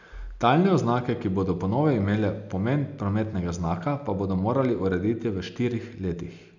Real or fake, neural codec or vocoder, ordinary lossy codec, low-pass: real; none; none; 7.2 kHz